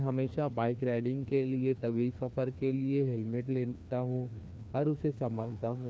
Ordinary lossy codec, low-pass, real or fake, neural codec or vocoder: none; none; fake; codec, 16 kHz, 2 kbps, FreqCodec, larger model